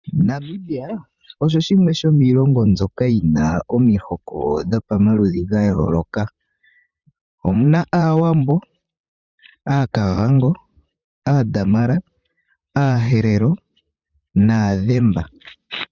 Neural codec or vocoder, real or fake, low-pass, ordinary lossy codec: vocoder, 44.1 kHz, 128 mel bands, Pupu-Vocoder; fake; 7.2 kHz; Opus, 64 kbps